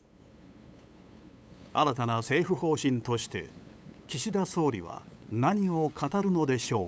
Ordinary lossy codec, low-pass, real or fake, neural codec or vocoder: none; none; fake; codec, 16 kHz, 8 kbps, FunCodec, trained on LibriTTS, 25 frames a second